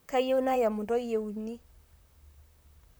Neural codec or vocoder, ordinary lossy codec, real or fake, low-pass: vocoder, 44.1 kHz, 128 mel bands, Pupu-Vocoder; none; fake; none